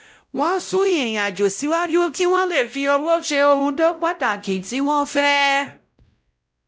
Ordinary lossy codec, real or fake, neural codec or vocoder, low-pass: none; fake; codec, 16 kHz, 0.5 kbps, X-Codec, WavLM features, trained on Multilingual LibriSpeech; none